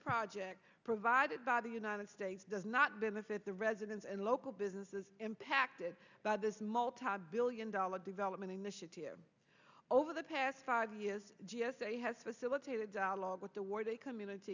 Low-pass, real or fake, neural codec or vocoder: 7.2 kHz; real; none